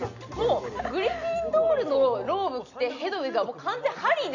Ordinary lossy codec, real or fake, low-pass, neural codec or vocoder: none; real; 7.2 kHz; none